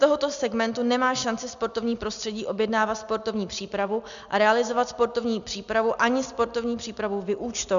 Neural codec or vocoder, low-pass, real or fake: none; 7.2 kHz; real